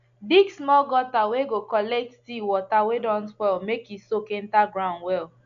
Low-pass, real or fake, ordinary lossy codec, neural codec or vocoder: 7.2 kHz; real; AAC, 64 kbps; none